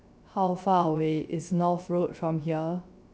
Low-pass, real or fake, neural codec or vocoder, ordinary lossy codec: none; fake; codec, 16 kHz, 0.3 kbps, FocalCodec; none